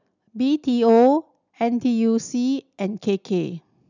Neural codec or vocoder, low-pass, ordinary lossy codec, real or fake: none; 7.2 kHz; none; real